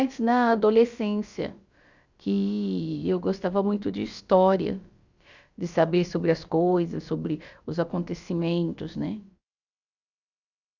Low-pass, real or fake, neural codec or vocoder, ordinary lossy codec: 7.2 kHz; fake; codec, 16 kHz, about 1 kbps, DyCAST, with the encoder's durations; Opus, 64 kbps